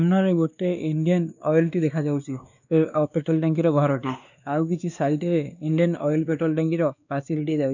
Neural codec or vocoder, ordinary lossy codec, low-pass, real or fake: codec, 16 kHz, 2 kbps, X-Codec, WavLM features, trained on Multilingual LibriSpeech; none; 7.2 kHz; fake